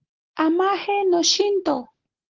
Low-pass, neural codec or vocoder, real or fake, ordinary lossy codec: 7.2 kHz; none; real; Opus, 16 kbps